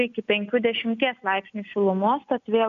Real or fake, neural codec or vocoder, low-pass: real; none; 7.2 kHz